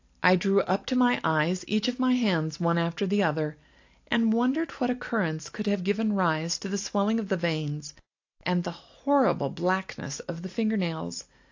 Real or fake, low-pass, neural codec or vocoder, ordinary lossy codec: real; 7.2 kHz; none; AAC, 48 kbps